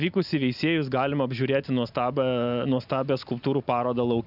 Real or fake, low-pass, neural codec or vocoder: real; 5.4 kHz; none